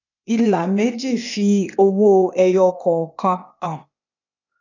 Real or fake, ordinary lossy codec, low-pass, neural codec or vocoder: fake; none; 7.2 kHz; codec, 16 kHz, 0.8 kbps, ZipCodec